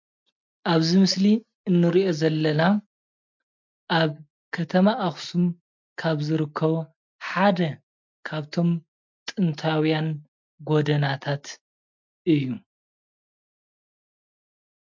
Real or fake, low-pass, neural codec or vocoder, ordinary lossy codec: real; 7.2 kHz; none; MP3, 64 kbps